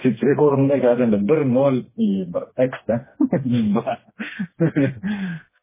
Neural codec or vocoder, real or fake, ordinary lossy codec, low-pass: codec, 16 kHz, 2 kbps, FreqCodec, smaller model; fake; MP3, 16 kbps; 3.6 kHz